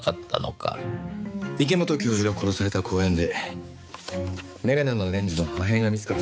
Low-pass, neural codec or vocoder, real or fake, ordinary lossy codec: none; codec, 16 kHz, 4 kbps, X-Codec, HuBERT features, trained on balanced general audio; fake; none